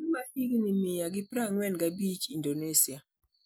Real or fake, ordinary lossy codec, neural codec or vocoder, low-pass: real; none; none; none